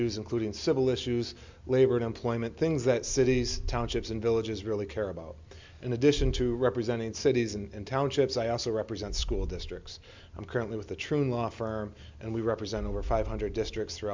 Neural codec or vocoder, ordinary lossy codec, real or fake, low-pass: none; MP3, 64 kbps; real; 7.2 kHz